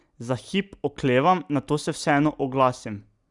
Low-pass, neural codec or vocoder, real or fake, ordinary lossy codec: 10.8 kHz; none; real; Opus, 64 kbps